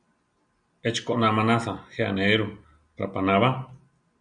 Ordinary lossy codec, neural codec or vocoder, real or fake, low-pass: MP3, 96 kbps; none; real; 9.9 kHz